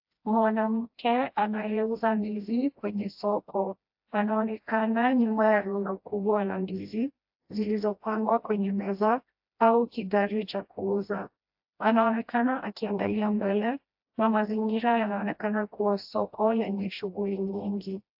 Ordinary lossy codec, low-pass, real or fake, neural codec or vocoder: AAC, 48 kbps; 5.4 kHz; fake; codec, 16 kHz, 1 kbps, FreqCodec, smaller model